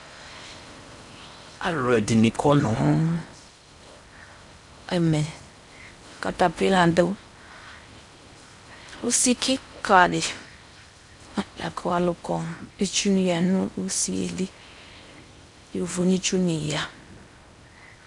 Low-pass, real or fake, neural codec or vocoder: 10.8 kHz; fake; codec, 16 kHz in and 24 kHz out, 0.6 kbps, FocalCodec, streaming, 4096 codes